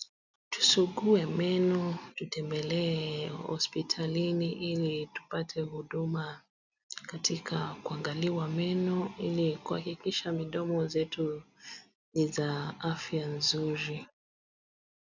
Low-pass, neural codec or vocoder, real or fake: 7.2 kHz; none; real